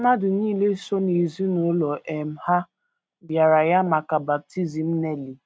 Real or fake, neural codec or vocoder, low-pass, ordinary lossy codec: real; none; none; none